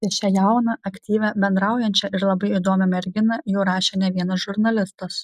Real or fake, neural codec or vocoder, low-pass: real; none; 14.4 kHz